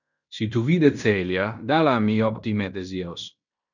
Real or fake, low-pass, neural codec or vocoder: fake; 7.2 kHz; codec, 16 kHz in and 24 kHz out, 0.9 kbps, LongCat-Audio-Codec, fine tuned four codebook decoder